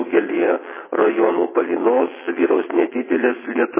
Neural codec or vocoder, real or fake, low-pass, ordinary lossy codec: vocoder, 22.05 kHz, 80 mel bands, WaveNeXt; fake; 3.6 kHz; MP3, 16 kbps